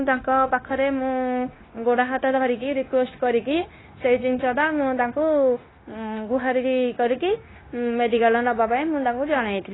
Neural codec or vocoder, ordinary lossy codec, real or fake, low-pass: codec, 16 kHz, 0.9 kbps, LongCat-Audio-Codec; AAC, 16 kbps; fake; 7.2 kHz